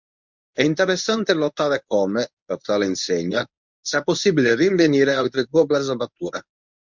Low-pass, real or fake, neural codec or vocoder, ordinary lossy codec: 7.2 kHz; fake; codec, 24 kHz, 0.9 kbps, WavTokenizer, medium speech release version 1; MP3, 64 kbps